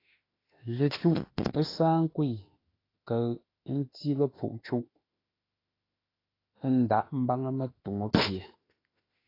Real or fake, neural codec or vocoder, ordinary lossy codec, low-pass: fake; autoencoder, 48 kHz, 32 numbers a frame, DAC-VAE, trained on Japanese speech; AAC, 24 kbps; 5.4 kHz